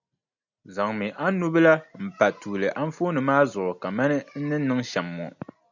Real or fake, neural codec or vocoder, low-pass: real; none; 7.2 kHz